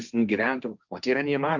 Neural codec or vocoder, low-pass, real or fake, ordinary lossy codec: codec, 16 kHz, 1.1 kbps, Voila-Tokenizer; 7.2 kHz; fake; Opus, 64 kbps